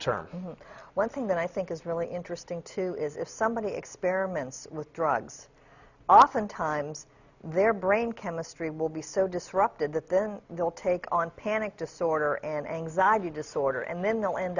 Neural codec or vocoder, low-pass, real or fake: none; 7.2 kHz; real